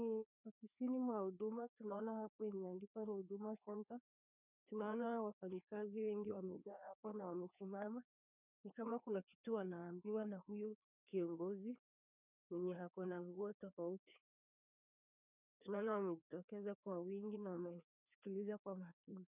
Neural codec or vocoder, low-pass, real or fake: codec, 16 kHz, 2 kbps, FreqCodec, larger model; 3.6 kHz; fake